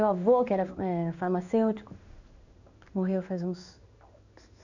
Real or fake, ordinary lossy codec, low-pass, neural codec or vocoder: fake; none; 7.2 kHz; codec, 16 kHz in and 24 kHz out, 1 kbps, XY-Tokenizer